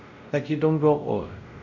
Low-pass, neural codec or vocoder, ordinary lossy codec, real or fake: 7.2 kHz; codec, 16 kHz, 0.5 kbps, X-Codec, WavLM features, trained on Multilingual LibriSpeech; none; fake